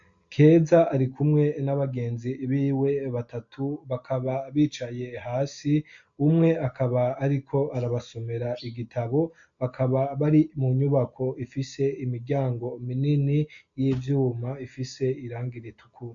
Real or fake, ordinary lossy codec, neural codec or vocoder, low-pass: real; AAC, 64 kbps; none; 7.2 kHz